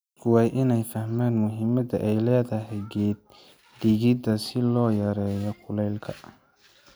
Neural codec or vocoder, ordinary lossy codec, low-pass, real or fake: none; none; none; real